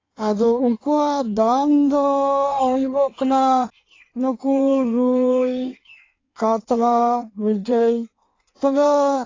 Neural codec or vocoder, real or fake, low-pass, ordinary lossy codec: codec, 16 kHz in and 24 kHz out, 1.1 kbps, FireRedTTS-2 codec; fake; 7.2 kHz; AAC, 32 kbps